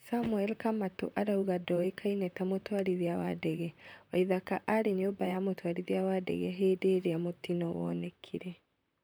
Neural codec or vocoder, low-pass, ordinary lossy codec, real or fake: vocoder, 44.1 kHz, 128 mel bands, Pupu-Vocoder; none; none; fake